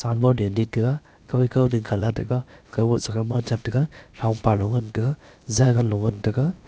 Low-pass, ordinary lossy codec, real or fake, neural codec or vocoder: none; none; fake; codec, 16 kHz, about 1 kbps, DyCAST, with the encoder's durations